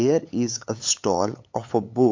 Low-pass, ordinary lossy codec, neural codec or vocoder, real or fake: 7.2 kHz; MP3, 48 kbps; codec, 16 kHz, 8 kbps, FunCodec, trained on LibriTTS, 25 frames a second; fake